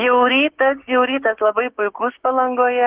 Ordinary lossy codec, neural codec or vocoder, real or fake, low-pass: Opus, 16 kbps; codec, 16 kHz, 6 kbps, DAC; fake; 3.6 kHz